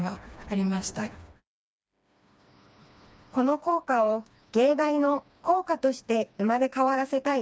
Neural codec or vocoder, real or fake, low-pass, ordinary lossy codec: codec, 16 kHz, 2 kbps, FreqCodec, smaller model; fake; none; none